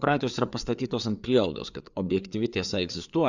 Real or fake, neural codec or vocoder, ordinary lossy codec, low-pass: fake; codec, 16 kHz, 4 kbps, FreqCodec, larger model; Opus, 64 kbps; 7.2 kHz